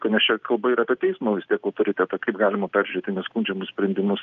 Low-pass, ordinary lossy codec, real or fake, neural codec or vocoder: 7.2 kHz; Opus, 24 kbps; real; none